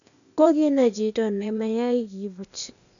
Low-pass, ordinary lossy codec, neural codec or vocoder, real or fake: 7.2 kHz; none; codec, 16 kHz, 0.8 kbps, ZipCodec; fake